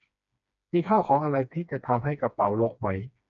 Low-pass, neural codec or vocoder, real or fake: 7.2 kHz; codec, 16 kHz, 2 kbps, FreqCodec, smaller model; fake